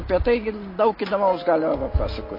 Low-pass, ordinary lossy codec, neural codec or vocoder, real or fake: 5.4 kHz; MP3, 24 kbps; none; real